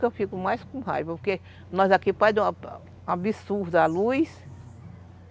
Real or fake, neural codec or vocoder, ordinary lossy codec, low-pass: real; none; none; none